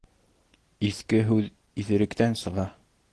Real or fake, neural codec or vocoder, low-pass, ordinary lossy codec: real; none; 10.8 kHz; Opus, 16 kbps